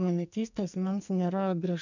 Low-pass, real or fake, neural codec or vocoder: 7.2 kHz; fake; codec, 44.1 kHz, 2.6 kbps, SNAC